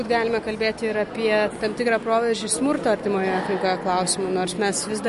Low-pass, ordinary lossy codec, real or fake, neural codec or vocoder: 14.4 kHz; MP3, 48 kbps; real; none